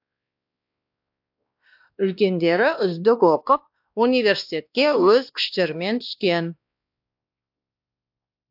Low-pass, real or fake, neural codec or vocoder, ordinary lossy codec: 5.4 kHz; fake; codec, 16 kHz, 1 kbps, X-Codec, WavLM features, trained on Multilingual LibriSpeech; AAC, 48 kbps